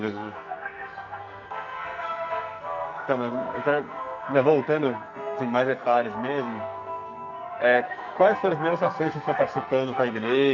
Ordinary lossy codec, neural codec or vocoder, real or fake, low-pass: none; codec, 44.1 kHz, 2.6 kbps, SNAC; fake; 7.2 kHz